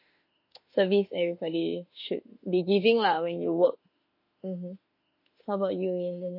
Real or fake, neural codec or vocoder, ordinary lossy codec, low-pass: fake; autoencoder, 48 kHz, 32 numbers a frame, DAC-VAE, trained on Japanese speech; MP3, 32 kbps; 5.4 kHz